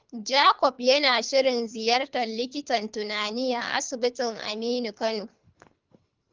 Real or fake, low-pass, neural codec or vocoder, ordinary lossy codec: fake; 7.2 kHz; codec, 24 kHz, 3 kbps, HILCodec; Opus, 24 kbps